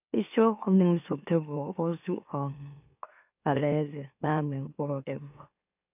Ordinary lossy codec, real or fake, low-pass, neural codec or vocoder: none; fake; 3.6 kHz; autoencoder, 44.1 kHz, a latent of 192 numbers a frame, MeloTTS